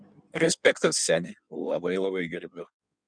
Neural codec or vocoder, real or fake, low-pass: codec, 16 kHz in and 24 kHz out, 1.1 kbps, FireRedTTS-2 codec; fake; 9.9 kHz